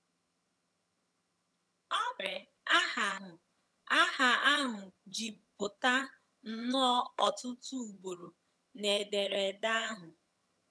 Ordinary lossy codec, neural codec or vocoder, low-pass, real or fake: none; vocoder, 22.05 kHz, 80 mel bands, HiFi-GAN; none; fake